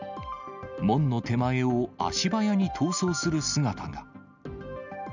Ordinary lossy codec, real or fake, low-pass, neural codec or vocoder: none; real; 7.2 kHz; none